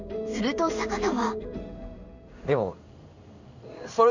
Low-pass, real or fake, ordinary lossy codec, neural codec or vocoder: 7.2 kHz; fake; none; codec, 16 kHz in and 24 kHz out, 1 kbps, XY-Tokenizer